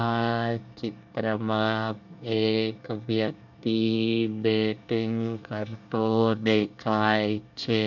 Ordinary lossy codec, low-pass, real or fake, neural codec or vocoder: none; 7.2 kHz; fake; codec, 24 kHz, 1 kbps, SNAC